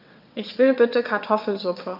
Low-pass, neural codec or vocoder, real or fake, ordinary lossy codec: 5.4 kHz; vocoder, 22.05 kHz, 80 mel bands, WaveNeXt; fake; none